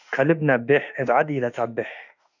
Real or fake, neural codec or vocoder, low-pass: fake; autoencoder, 48 kHz, 32 numbers a frame, DAC-VAE, trained on Japanese speech; 7.2 kHz